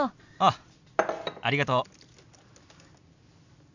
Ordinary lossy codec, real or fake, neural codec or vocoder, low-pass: none; real; none; 7.2 kHz